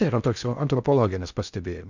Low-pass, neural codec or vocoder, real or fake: 7.2 kHz; codec, 16 kHz in and 24 kHz out, 0.6 kbps, FocalCodec, streaming, 2048 codes; fake